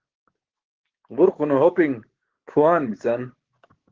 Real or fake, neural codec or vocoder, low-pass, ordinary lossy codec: fake; codec, 16 kHz, 6 kbps, DAC; 7.2 kHz; Opus, 16 kbps